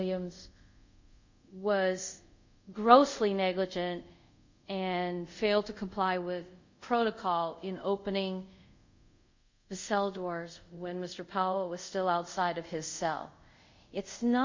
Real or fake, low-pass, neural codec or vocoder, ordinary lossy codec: fake; 7.2 kHz; codec, 24 kHz, 0.5 kbps, DualCodec; MP3, 48 kbps